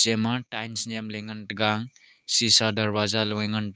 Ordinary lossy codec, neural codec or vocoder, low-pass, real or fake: none; codec, 16 kHz, 6 kbps, DAC; none; fake